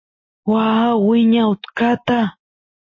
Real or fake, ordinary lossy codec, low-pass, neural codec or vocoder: real; MP3, 32 kbps; 7.2 kHz; none